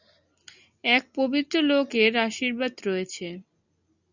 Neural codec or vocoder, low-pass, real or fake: none; 7.2 kHz; real